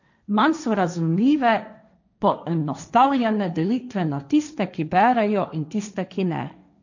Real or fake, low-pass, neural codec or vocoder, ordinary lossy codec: fake; 7.2 kHz; codec, 16 kHz, 1.1 kbps, Voila-Tokenizer; none